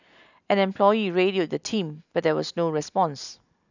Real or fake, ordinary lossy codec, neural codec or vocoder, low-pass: real; none; none; 7.2 kHz